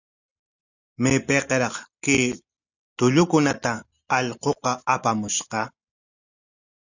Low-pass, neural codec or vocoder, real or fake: 7.2 kHz; none; real